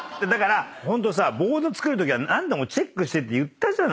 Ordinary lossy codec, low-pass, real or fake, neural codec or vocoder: none; none; real; none